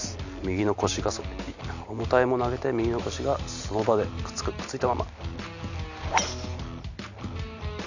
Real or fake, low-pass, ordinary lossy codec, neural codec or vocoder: real; 7.2 kHz; none; none